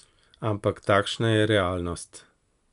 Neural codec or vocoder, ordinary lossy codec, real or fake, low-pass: none; none; real; 10.8 kHz